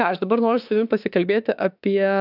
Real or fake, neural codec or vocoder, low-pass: fake; autoencoder, 48 kHz, 32 numbers a frame, DAC-VAE, trained on Japanese speech; 5.4 kHz